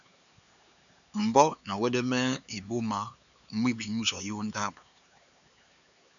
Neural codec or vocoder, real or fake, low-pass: codec, 16 kHz, 4 kbps, X-Codec, HuBERT features, trained on LibriSpeech; fake; 7.2 kHz